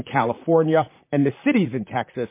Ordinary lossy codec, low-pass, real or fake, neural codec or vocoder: MP3, 24 kbps; 3.6 kHz; fake; vocoder, 44.1 kHz, 128 mel bands, Pupu-Vocoder